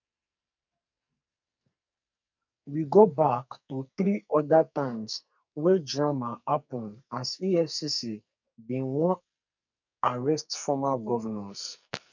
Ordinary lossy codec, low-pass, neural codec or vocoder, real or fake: none; 7.2 kHz; codec, 44.1 kHz, 2.6 kbps, SNAC; fake